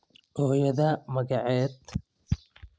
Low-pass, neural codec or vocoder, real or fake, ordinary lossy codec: none; none; real; none